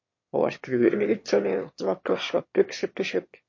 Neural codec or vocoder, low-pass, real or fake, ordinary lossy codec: autoencoder, 22.05 kHz, a latent of 192 numbers a frame, VITS, trained on one speaker; 7.2 kHz; fake; MP3, 32 kbps